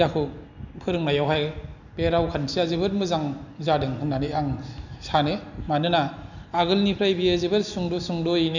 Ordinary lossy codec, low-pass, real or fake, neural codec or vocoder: none; 7.2 kHz; real; none